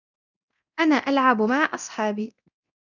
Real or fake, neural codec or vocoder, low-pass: fake; codec, 24 kHz, 0.9 kbps, DualCodec; 7.2 kHz